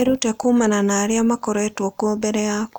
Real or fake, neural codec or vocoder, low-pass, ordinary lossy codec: real; none; none; none